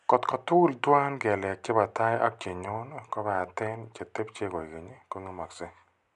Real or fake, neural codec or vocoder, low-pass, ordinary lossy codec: real; none; 10.8 kHz; none